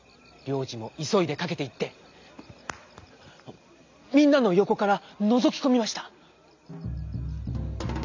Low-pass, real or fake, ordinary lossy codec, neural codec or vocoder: 7.2 kHz; real; MP3, 64 kbps; none